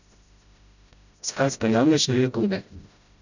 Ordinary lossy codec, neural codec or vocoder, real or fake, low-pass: none; codec, 16 kHz, 0.5 kbps, FreqCodec, smaller model; fake; 7.2 kHz